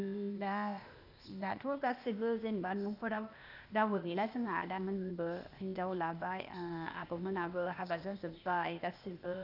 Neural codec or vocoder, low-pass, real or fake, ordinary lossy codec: codec, 16 kHz, 0.8 kbps, ZipCodec; 5.4 kHz; fake; none